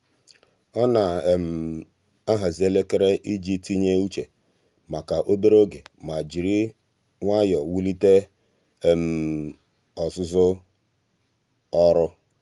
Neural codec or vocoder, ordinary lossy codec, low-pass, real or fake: none; Opus, 32 kbps; 10.8 kHz; real